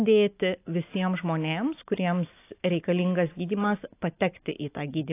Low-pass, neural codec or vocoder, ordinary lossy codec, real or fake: 3.6 kHz; none; AAC, 24 kbps; real